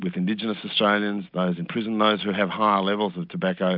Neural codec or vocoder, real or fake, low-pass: none; real; 5.4 kHz